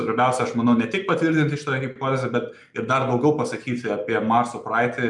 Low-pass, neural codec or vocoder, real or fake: 10.8 kHz; none; real